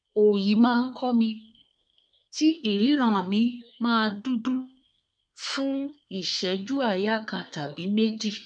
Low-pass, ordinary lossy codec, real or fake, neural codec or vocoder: 9.9 kHz; none; fake; codec, 24 kHz, 1 kbps, SNAC